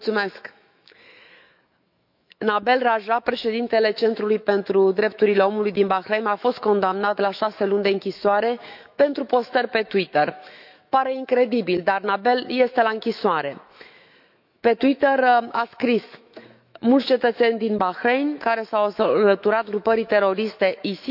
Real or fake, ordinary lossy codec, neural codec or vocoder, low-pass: fake; none; autoencoder, 48 kHz, 128 numbers a frame, DAC-VAE, trained on Japanese speech; 5.4 kHz